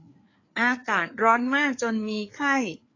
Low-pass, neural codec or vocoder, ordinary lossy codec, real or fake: 7.2 kHz; codec, 16 kHz, 4 kbps, FreqCodec, larger model; AAC, 32 kbps; fake